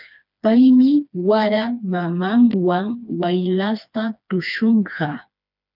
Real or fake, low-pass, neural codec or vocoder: fake; 5.4 kHz; codec, 16 kHz, 2 kbps, FreqCodec, smaller model